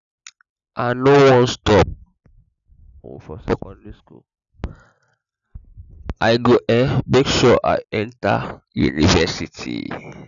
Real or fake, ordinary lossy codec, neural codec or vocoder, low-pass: real; none; none; 7.2 kHz